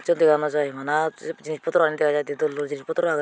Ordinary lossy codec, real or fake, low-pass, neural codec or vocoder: none; real; none; none